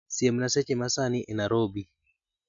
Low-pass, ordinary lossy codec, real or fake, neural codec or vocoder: 7.2 kHz; MP3, 64 kbps; real; none